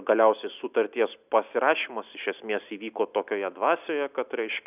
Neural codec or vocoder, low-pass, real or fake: none; 3.6 kHz; real